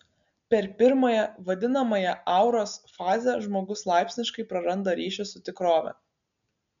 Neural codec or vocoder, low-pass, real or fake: none; 7.2 kHz; real